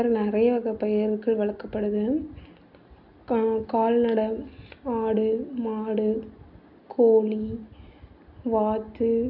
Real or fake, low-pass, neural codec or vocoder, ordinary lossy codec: real; 5.4 kHz; none; none